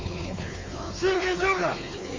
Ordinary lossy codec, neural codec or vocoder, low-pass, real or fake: Opus, 32 kbps; codec, 16 kHz, 4 kbps, X-Codec, WavLM features, trained on Multilingual LibriSpeech; 7.2 kHz; fake